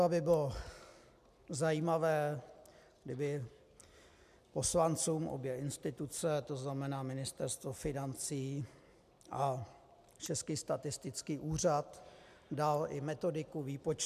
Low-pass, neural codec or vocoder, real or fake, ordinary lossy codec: 14.4 kHz; none; real; MP3, 96 kbps